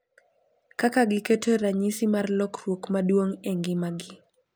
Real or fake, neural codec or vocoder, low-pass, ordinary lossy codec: real; none; none; none